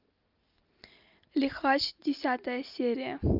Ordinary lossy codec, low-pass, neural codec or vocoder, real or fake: Opus, 24 kbps; 5.4 kHz; none; real